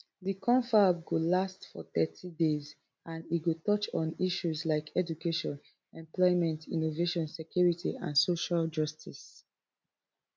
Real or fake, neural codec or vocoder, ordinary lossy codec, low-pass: real; none; none; none